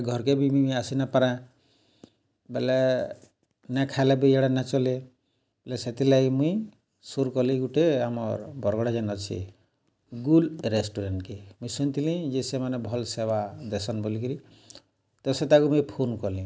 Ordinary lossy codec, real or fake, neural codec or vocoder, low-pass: none; real; none; none